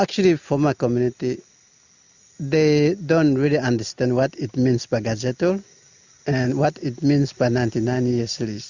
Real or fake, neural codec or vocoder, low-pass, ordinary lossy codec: real; none; 7.2 kHz; Opus, 64 kbps